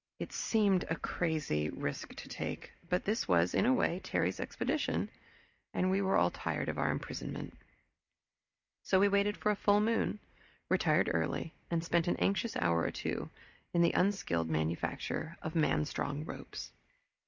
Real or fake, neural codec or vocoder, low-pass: real; none; 7.2 kHz